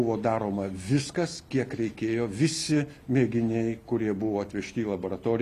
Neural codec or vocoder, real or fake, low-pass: none; real; 14.4 kHz